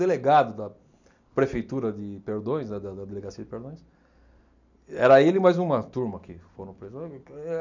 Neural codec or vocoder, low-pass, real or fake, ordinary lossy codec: none; 7.2 kHz; real; none